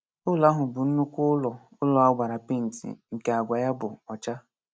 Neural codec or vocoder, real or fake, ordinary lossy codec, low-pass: none; real; none; none